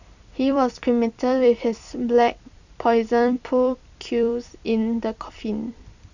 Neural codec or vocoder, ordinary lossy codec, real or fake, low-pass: vocoder, 44.1 kHz, 128 mel bands every 256 samples, BigVGAN v2; none; fake; 7.2 kHz